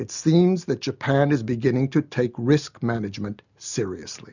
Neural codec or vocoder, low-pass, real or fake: none; 7.2 kHz; real